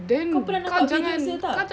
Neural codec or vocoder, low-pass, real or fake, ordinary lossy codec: none; none; real; none